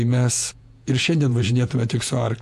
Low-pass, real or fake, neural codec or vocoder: 14.4 kHz; fake; vocoder, 48 kHz, 128 mel bands, Vocos